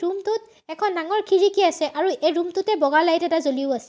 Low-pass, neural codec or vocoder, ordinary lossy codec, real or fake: none; none; none; real